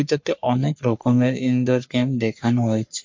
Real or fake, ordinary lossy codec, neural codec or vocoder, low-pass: fake; MP3, 48 kbps; codec, 44.1 kHz, 3.4 kbps, Pupu-Codec; 7.2 kHz